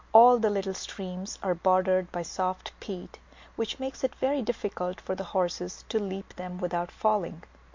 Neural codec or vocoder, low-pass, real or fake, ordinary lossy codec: none; 7.2 kHz; real; MP3, 48 kbps